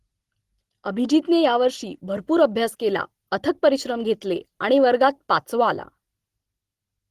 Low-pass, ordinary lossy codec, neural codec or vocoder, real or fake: 14.4 kHz; Opus, 16 kbps; none; real